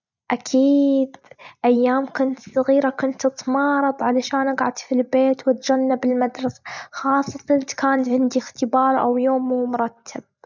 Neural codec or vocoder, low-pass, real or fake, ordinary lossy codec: none; 7.2 kHz; real; none